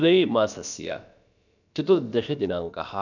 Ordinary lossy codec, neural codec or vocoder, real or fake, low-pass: none; codec, 16 kHz, about 1 kbps, DyCAST, with the encoder's durations; fake; 7.2 kHz